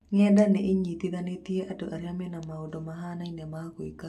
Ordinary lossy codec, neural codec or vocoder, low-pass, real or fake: AAC, 96 kbps; none; 14.4 kHz; real